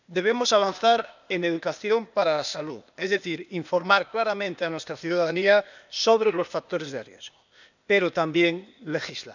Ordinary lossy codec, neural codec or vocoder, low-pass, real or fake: none; codec, 16 kHz, 0.8 kbps, ZipCodec; 7.2 kHz; fake